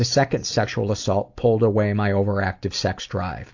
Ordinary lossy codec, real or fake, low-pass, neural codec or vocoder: AAC, 48 kbps; real; 7.2 kHz; none